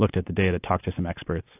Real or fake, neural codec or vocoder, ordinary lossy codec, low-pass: real; none; AAC, 32 kbps; 3.6 kHz